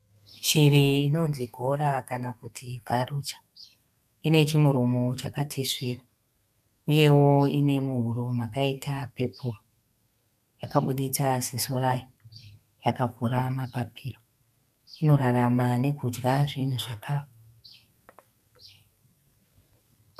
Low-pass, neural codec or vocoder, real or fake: 14.4 kHz; codec, 32 kHz, 1.9 kbps, SNAC; fake